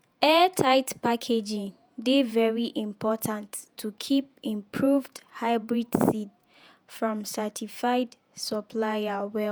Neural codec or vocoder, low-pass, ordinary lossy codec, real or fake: vocoder, 48 kHz, 128 mel bands, Vocos; none; none; fake